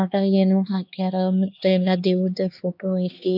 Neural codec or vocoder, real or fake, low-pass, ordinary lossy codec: codec, 16 kHz, 2 kbps, X-Codec, HuBERT features, trained on LibriSpeech; fake; 5.4 kHz; none